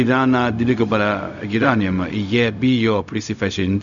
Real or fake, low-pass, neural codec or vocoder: fake; 7.2 kHz; codec, 16 kHz, 0.4 kbps, LongCat-Audio-Codec